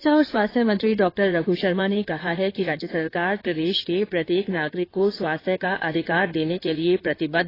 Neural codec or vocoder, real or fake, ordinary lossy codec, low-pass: codec, 16 kHz in and 24 kHz out, 2.2 kbps, FireRedTTS-2 codec; fake; AAC, 24 kbps; 5.4 kHz